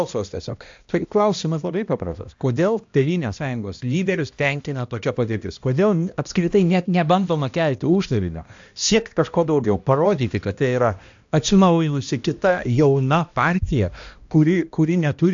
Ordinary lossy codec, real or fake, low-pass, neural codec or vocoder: AAC, 64 kbps; fake; 7.2 kHz; codec, 16 kHz, 1 kbps, X-Codec, HuBERT features, trained on balanced general audio